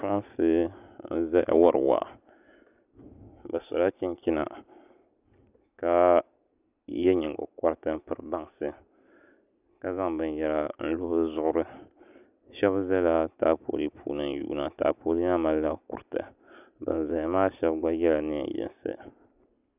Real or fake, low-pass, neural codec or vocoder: real; 3.6 kHz; none